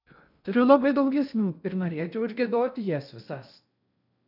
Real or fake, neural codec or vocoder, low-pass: fake; codec, 16 kHz in and 24 kHz out, 0.6 kbps, FocalCodec, streaming, 2048 codes; 5.4 kHz